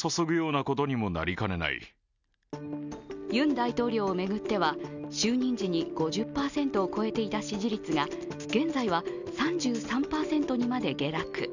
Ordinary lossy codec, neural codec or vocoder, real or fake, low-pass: none; none; real; 7.2 kHz